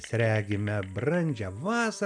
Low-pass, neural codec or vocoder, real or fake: 9.9 kHz; none; real